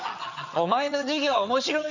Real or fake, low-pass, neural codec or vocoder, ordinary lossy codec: fake; 7.2 kHz; vocoder, 22.05 kHz, 80 mel bands, HiFi-GAN; none